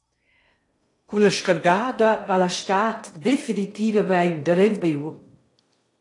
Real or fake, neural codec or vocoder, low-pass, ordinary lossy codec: fake; codec, 16 kHz in and 24 kHz out, 0.6 kbps, FocalCodec, streaming, 2048 codes; 10.8 kHz; AAC, 48 kbps